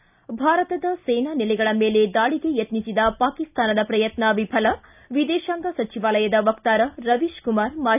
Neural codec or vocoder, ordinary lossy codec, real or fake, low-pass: none; none; real; 3.6 kHz